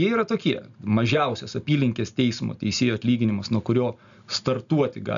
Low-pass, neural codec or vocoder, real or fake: 7.2 kHz; none; real